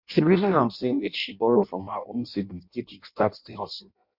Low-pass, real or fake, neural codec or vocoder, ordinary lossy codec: 5.4 kHz; fake; codec, 16 kHz in and 24 kHz out, 0.6 kbps, FireRedTTS-2 codec; MP3, 48 kbps